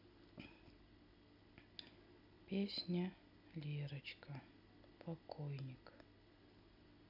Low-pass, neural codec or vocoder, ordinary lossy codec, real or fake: 5.4 kHz; none; none; real